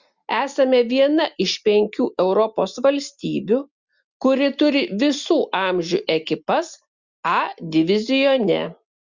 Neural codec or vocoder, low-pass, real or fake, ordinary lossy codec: none; 7.2 kHz; real; Opus, 64 kbps